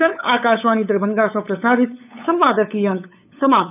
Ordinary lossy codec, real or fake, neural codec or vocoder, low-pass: none; fake; codec, 16 kHz, 8 kbps, FunCodec, trained on LibriTTS, 25 frames a second; 3.6 kHz